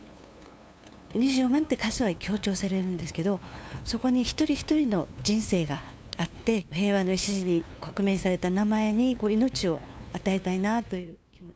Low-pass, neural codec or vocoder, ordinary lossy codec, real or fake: none; codec, 16 kHz, 2 kbps, FunCodec, trained on LibriTTS, 25 frames a second; none; fake